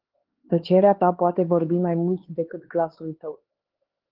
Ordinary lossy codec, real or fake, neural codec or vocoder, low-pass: Opus, 16 kbps; fake; codec, 16 kHz, 2 kbps, X-Codec, HuBERT features, trained on LibriSpeech; 5.4 kHz